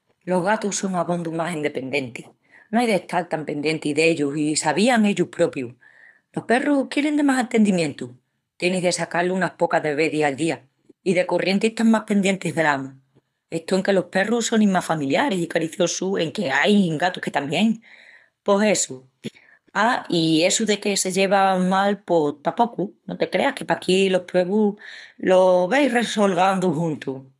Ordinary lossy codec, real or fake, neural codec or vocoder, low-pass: none; fake; codec, 24 kHz, 6 kbps, HILCodec; none